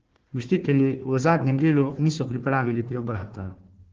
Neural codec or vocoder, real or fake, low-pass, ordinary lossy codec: codec, 16 kHz, 1 kbps, FunCodec, trained on Chinese and English, 50 frames a second; fake; 7.2 kHz; Opus, 16 kbps